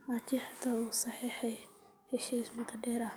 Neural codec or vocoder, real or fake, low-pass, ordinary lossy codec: codec, 44.1 kHz, 7.8 kbps, DAC; fake; none; none